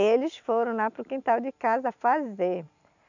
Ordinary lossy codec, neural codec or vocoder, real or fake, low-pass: none; none; real; 7.2 kHz